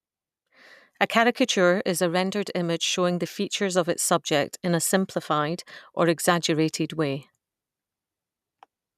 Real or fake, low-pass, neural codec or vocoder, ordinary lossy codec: real; 14.4 kHz; none; none